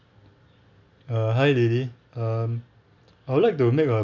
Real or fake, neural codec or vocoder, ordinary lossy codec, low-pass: real; none; none; 7.2 kHz